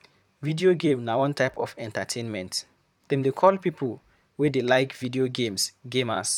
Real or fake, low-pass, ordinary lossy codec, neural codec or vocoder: fake; 19.8 kHz; none; vocoder, 44.1 kHz, 128 mel bands, Pupu-Vocoder